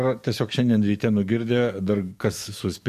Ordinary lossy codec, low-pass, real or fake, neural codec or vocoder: MP3, 64 kbps; 14.4 kHz; fake; codec, 44.1 kHz, 7.8 kbps, DAC